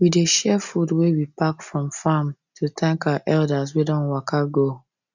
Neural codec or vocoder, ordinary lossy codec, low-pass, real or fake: none; none; 7.2 kHz; real